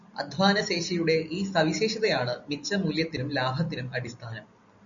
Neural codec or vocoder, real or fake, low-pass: none; real; 7.2 kHz